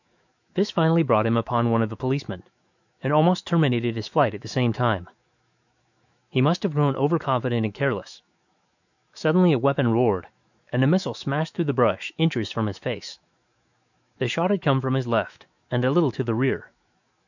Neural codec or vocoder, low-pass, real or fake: autoencoder, 48 kHz, 128 numbers a frame, DAC-VAE, trained on Japanese speech; 7.2 kHz; fake